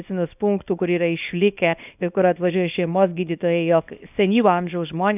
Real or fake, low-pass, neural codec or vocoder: fake; 3.6 kHz; codec, 24 kHz, 0.9 kbps, WavTokenizer, small release